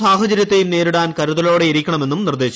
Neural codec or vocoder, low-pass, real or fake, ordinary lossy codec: none; none; real; none